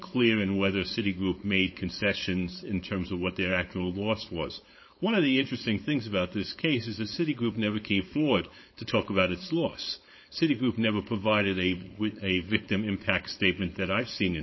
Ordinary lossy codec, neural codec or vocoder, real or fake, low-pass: MP3, 24 kbps; codec, 16 kHz, 4.8 kbps, FACodec; fake; 7.2 kHz